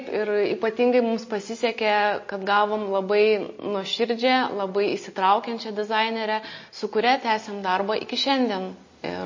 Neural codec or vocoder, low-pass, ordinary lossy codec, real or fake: none; 7.2 kHz; MP3, 32 kbps; real